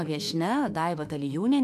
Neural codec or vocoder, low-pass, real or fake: autoencoder, 48 kHz, 32 numbers a frame, DAC-VAE, trained on Japanese speech; 14.4 kHz; fake